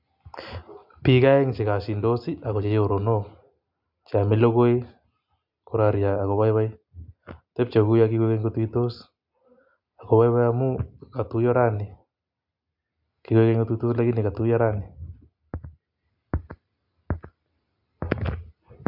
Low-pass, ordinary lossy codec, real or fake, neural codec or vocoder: 5.4 kHz; MP3, 48 kbps; real; none